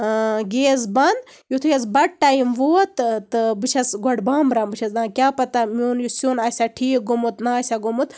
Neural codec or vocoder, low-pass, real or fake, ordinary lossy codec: none; none; real; none